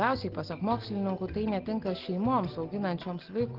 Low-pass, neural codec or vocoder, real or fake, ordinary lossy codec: 5.4 kHz; none; real; Opus, 16 kbps